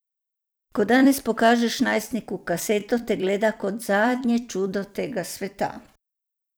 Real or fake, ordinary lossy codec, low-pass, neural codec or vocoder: fake; none; none; vocoder, 44.1 kHz, 128 mel bands every 256 samples, BigVGAN v2